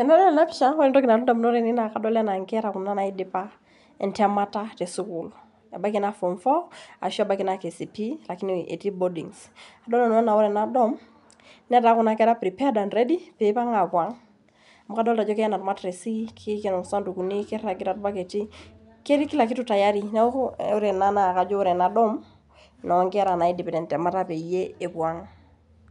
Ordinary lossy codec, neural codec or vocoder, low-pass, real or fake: MP3, 96 kbps; none; 10.8 kHz; real